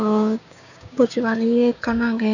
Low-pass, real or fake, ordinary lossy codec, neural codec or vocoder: 7.2 kHz; fake; none; codec, 16 kHz, 6 kbps, DAC